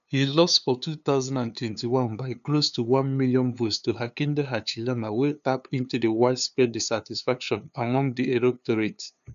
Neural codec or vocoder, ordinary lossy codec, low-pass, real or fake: codec, 16 kHz, 2 kbps, FunCodec, trained on LibriTTS, 25 frames a second; none; 7.2 kHz; fake